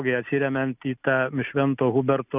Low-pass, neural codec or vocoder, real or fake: 3.6 kHz; none; real